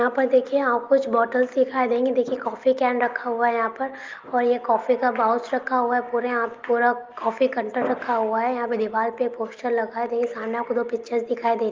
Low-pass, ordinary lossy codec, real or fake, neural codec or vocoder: 7.2 kHz; Opus, 24 kbps; real; none